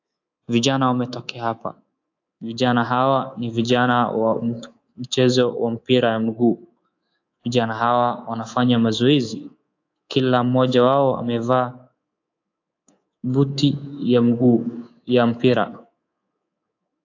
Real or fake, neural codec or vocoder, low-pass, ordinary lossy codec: fake; codec, 24 kHz, 3.1 kbps, DualCodec; 7.2 kHz; AAC, 48 kbps